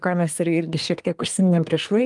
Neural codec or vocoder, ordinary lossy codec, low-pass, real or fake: codec, 24 kHz, 1 kbps, SNAC; Opus, 32 kbps; 10.8 kHz; fake